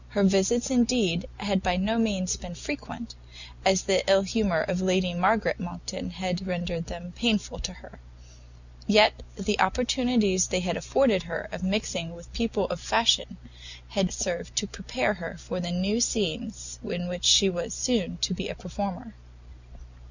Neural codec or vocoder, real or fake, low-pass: none; real; 7.2 kHz